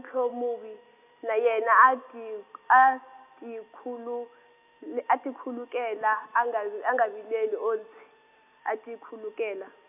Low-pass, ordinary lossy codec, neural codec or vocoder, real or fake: 3.6 kHz; none; none; real